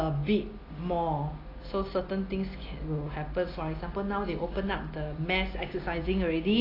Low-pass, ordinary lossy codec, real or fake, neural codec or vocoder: 5.4 kHz; AAC, 24 kbps; real; none